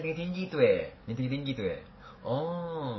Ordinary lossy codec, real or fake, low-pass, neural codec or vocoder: MP3, 24 kbps; fake; 7.2 kHz; codec, 44.1 kHz, 7.8 kbps, DAC